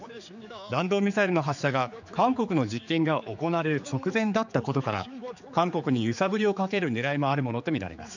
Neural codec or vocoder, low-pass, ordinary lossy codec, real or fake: codec, 16 kHz, 4 kbps, X-Codec, HuBERT features, trained on general audio; 7.2 kHz; none; fake